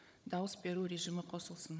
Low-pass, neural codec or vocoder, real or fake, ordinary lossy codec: none; codec, 16 kHz, 16 kbps, FunCodec, trained on Chinese and English, 50 frames a second; fake; none